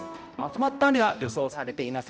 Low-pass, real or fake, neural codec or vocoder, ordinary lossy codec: none; fake; codec, 16 kHz, 0.5 kbps, X-Codec, HuBERT features, trained on balanced general audio; none